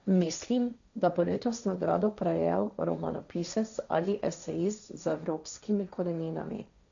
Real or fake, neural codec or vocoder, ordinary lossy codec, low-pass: fake; codec, 16 kHz, 1.1 kbps, Voila-Tokenizer; none; 7.2 kHz